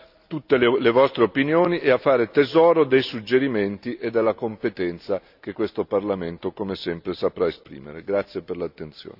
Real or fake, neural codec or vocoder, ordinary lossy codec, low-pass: real; none; none; 5.4 kHz